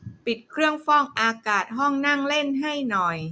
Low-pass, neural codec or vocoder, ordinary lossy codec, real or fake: none; none; none; real